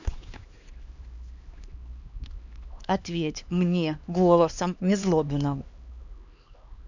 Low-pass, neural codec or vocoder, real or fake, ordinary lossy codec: 7.2 kHz; codec, 16 kHz, 2 kbps, X-Codec, HuBERT features, trained on LibriSpeech; fake; none